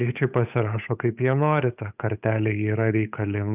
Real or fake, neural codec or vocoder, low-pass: fake; codec, 16 kHz, 8 kbps, FunCodec, trained on Chinese and English, 25 frames a second; 3.6 kHz